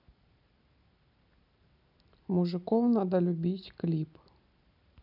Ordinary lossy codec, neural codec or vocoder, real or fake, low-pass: none; none; real; 5.4 kHz